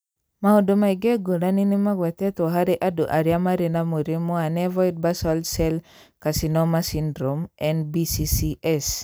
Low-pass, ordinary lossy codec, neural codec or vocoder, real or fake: none; none; none; real